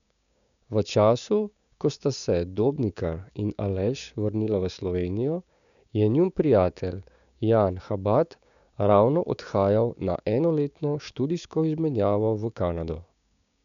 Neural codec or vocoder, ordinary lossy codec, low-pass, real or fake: codec, 16 kHz, 6 kbps, DAC; none; 7.2 kHz; fake